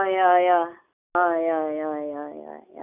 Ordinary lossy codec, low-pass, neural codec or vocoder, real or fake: none; 3.6 kHz; none; real